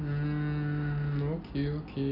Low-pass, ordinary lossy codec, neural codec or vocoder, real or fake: 5.4 kHz; none; none; real